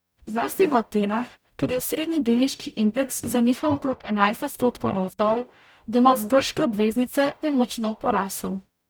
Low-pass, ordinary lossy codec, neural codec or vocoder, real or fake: none; none; codec, 44.1 kHz, 0.9 kbps, DAC; fake